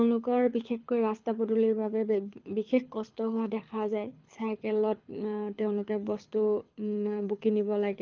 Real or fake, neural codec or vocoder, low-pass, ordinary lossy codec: fake; codec, 24 kHz, 6 kbps, HILCodec; 7.2 kHz; Opus, 32 kbps